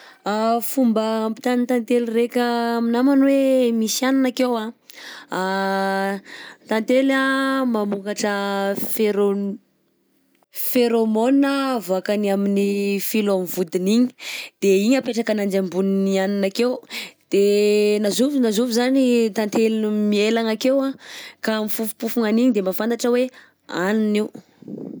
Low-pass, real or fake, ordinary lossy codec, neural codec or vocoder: none; real; none; none